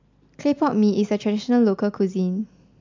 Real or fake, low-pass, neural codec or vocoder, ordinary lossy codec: real; 7.2 kHz; none; MP3, 64 kbps